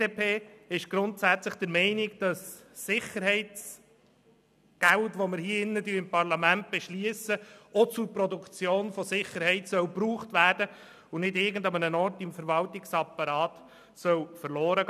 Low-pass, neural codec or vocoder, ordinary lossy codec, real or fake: 14.4 kHz; none; none; real